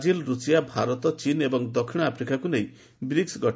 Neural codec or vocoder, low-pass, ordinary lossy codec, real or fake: none; none; none; real